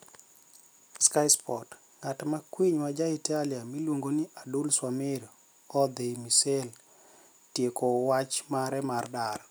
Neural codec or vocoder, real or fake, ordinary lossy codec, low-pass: none; real; none; none